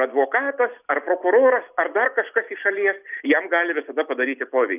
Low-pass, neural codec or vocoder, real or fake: 3.6 kHz; none; real